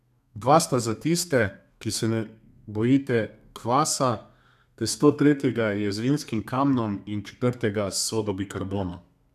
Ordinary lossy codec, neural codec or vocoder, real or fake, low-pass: none; codec, 32 kHz, 1.9 kbps, SNAC; fake; 14.4 kHz